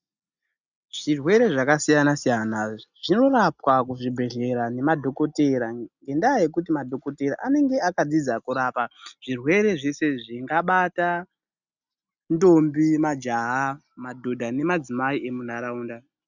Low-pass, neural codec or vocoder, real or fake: 7.2 kHz; none; real